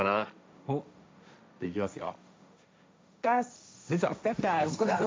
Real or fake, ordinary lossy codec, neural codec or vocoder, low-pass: fake; none; codec, 16 kHz, 1.1 kbps, Voila-Tokenizer; none